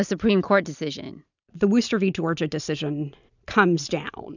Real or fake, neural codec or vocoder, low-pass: fake; vocoder, 44.1 kHz, 128 mel bands every 512 samples, BigVGAN v2; 7.2 kHz